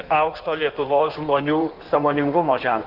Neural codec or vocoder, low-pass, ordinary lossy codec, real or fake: codec, 16 kHz in and 24 kHz out, 1.1 kbps, FireRedTTS-2 codec; 5.4 kHz; Opus, 24 kbps; fake